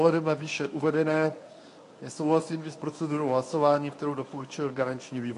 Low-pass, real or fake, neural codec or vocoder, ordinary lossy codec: 10.8 kHz; fake; codec, 24 kHz, 0.9 kbps, WavTokenizer, medium speech release version 1; AAC, 48 kbps